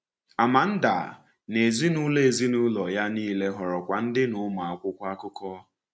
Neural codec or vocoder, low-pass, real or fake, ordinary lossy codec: none; none; real; none